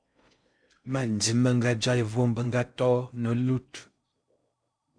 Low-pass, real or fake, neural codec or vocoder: 9.9 kHz; fake; codec, 16 kHz in and 24 kHz out, 0.6 kbps, FocalCodec, streaming, 4096 codes